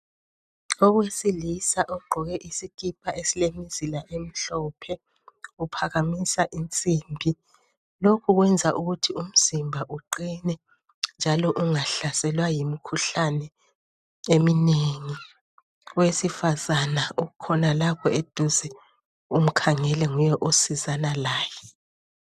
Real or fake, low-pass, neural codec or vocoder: real; 14.4 kHz; none